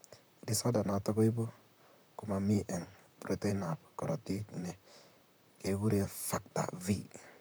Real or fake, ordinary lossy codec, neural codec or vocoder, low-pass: fake; none; vocoder, 44.1 kHz, 128 mel bands, Pupu-Vocoder; none